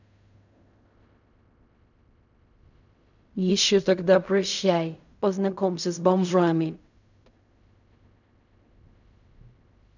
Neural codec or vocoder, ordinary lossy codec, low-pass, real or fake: codec, 16 kHz in and 24 kHz out, 0.4 kbps, LongCat-Audio-Codec, fine tuned four codebook decoder; none; 7.2 kHz; fake